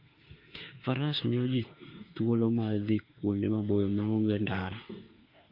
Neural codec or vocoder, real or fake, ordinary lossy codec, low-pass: autoencoder, 48 kHz, 32 numbers a frame, DAC-VAE, trained on Japanese speech; fake; Opus, 32 kbps; 5.4 kHz